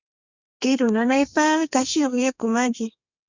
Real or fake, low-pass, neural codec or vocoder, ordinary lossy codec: fake; 7.2 kHz; codec, 32 kHz, 1.9 kbps, SNAC; Opus, 64 kbps